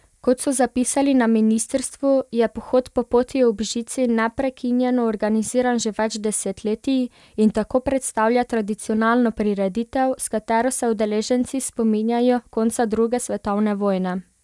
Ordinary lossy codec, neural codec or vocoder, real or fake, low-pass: none; none; real; none